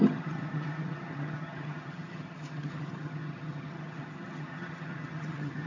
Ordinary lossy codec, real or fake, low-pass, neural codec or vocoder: AAC, 48 kbps; fake; 7.2 kHz; vocoder, 22.05 kHz, 80 mel bands, HiFi-GAN